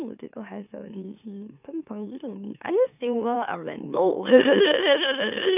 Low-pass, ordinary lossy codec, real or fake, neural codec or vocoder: 3.6 kHz; none; fake; autoencoder, 44.1 kHz, a latent of 192 numbers a frame, MeloTTS